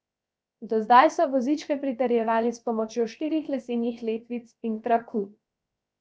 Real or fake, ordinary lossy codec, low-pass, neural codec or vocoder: fake; none; none; codec, 16 kHz, 0.7 kbps, FocalCodec